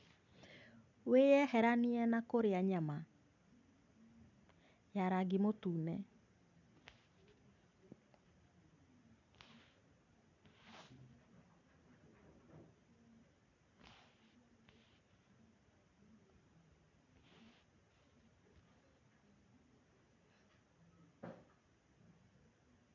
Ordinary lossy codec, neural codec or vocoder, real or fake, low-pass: none; none; real; 7.2 kHz